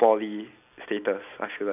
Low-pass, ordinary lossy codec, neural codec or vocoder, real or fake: 3.6 kHz; none; none; real